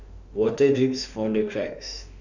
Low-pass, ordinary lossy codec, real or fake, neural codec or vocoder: 7.2 kHz; none; fake; autoencoder, 48 kHz, 32 numbers a frame, DAC-VAE, trained on Japanese speech